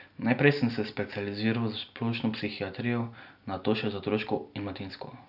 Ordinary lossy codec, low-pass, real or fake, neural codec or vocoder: none; 5.4 kHz; real; none